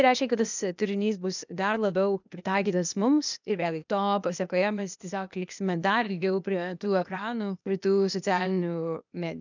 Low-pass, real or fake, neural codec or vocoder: 7.2 kHz; fake; codec, 16 kHz, 0.8 kbps, ZipCodec